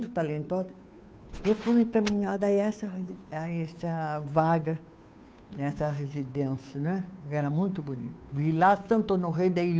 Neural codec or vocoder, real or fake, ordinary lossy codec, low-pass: codec, 16 kHz, 2 kbps, FunCodec, trained on Chinese and English, 25 frames a second; fake; none; none